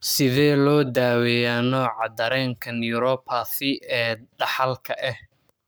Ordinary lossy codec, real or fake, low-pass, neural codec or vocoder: none; fake; none; codec, 44.1 kHz, 7.8 kbps, Pupu-Codec